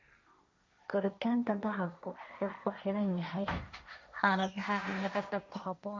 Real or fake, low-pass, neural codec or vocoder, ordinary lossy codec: fake; none; codec, 16 kHz, 1.1 kbps, Voila-Tokenizer; none